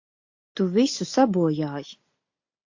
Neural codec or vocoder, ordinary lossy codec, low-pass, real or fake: none; AAC, 48 kbps; 7.2 kHz; real